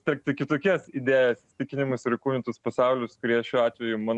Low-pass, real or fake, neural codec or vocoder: 10.8 kHz; real; none